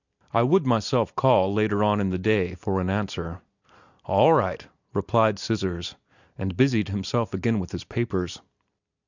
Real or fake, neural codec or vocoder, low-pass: real; none; 7.2 kHz